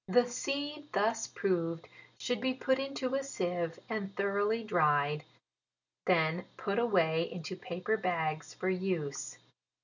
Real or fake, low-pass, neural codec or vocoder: real; 7.2 kHz; none